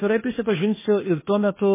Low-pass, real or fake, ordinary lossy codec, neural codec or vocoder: 3.6 kHz; fake; MP3, 16 kbps; codec, 16 kHz, 0.8 kbps, ZipCodec